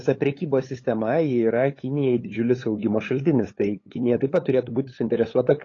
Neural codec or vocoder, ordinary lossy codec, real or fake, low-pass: codec, 16 kHz, 8 kbps, FunCodec, trained on LibriTTS, 25 frames a second; AAC, 32 kbps; fake; 7.2 kHz